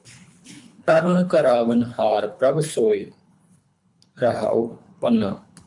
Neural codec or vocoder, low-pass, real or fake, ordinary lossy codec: codec, 24 kHz, 3 kbps, HILCodec; 10.8 kHz; fake; MP3, 96 kbps